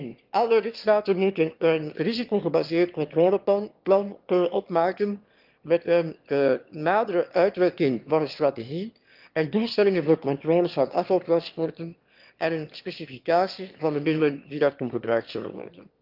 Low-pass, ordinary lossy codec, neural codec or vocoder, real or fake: 5.4 kHz; Opus, 32 kbps; autoencoder, 22.05 kHz, a latent of 192 numbers a frame, VITS, trained on one speaker; fake